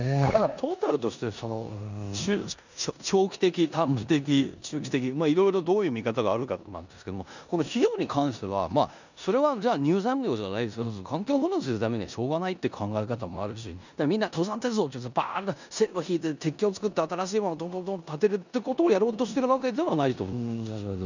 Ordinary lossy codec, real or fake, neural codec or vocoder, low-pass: none; fake; codec, 16 kHz in and 24 kHz out, 0.9 kbps, LongCat-Audio-Codec, four codebook decoder; 7.2 kHz